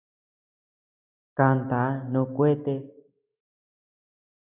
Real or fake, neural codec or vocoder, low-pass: real; none; 3.6 kHz